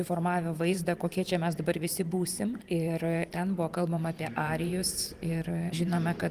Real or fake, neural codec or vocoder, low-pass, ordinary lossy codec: fake; vocoder, 44.1 kHz, 128 mel bands every 512 samples, BigVGAN v2; 14.4 kHz; Opus, 24 kbps